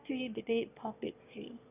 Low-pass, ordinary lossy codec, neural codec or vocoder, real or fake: 3.6 kHz; none; autoencoder, 22.05 kHz, a latent of 192 numbers a frame, VITS, trained on one speaker; fake